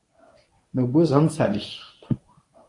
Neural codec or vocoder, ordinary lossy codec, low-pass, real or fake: codec, 24 kHz, 0.9 kbps, WavTokenizer, medium speech release version 1; AAC, 48 kbps; 10.8 kHz; fake